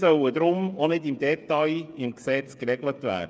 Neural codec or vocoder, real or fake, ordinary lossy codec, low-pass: codec, 16 kHz, 8 kbps, FreqCodec, smaller model; fake; none; none